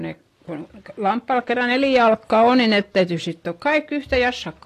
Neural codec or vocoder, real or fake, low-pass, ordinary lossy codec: none; real; 14.4 kHz; AAC, 48 kbps